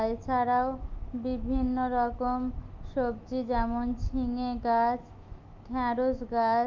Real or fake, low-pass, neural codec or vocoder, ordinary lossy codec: fake; 7.2 kHz; autoencoder, 48 kHz, 128 numbers a frame, DAC-VAE, trained on Japanese speech; Opus, 24 kbps